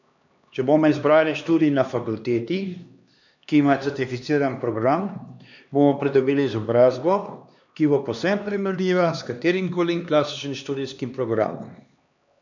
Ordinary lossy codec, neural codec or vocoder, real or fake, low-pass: none; codec, 16 kHz, 2 kbps, X-Codec, HuBERT features, trained on LibriSpeech; fake; 7.2 kHz